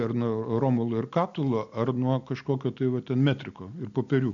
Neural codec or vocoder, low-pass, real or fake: none; 7.2 kHz; real